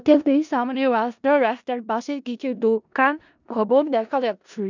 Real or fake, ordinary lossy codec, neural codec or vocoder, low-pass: fake; none; codec, 16 kHz in and 24 kHz out, 0.4 kbps, LongCat-Audio-Codec, four codebook decoder; 7.2 kHz